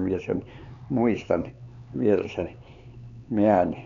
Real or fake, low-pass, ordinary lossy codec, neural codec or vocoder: fake; 7.2 kHz; none; codec, 16 kHz, 4 kbps, X-Codec, HuBERT features, trained on LibriSpeech